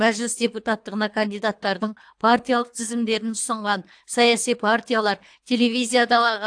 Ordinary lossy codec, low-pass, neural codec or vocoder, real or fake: AAC, 64 kbps; 9.9 kHz; codec, 24 kHz, 3 kbps, HILCodec; fake